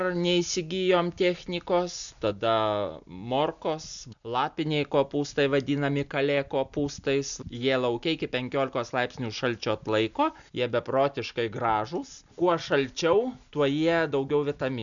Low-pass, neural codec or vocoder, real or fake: 7.2 kHz; none; real